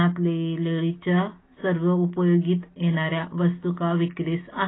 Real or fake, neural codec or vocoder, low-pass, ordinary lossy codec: real; none; 7.2 kHz; AAC, 16 kbps